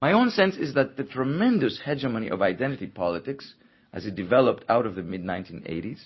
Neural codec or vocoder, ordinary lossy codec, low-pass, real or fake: none; MP3, 24 kbps; 7.2 kHz; real